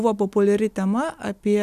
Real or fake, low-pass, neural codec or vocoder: real; 14.4 kHz; none